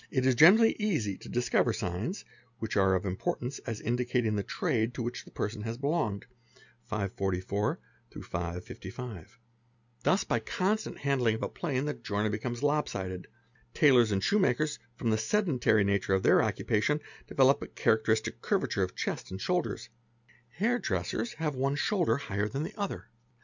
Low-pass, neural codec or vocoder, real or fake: 7.2 kHz; none; real